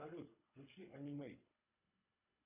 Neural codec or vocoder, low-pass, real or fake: codec, 24 kHz, 3 kbps, HILCodec; 3.6 kHz; fake